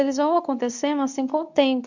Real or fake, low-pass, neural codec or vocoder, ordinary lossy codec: fake; 7.2 kHz; codec, 24 kHz, 0.9 kbps, WavTokenizer, medium speech release version 2; none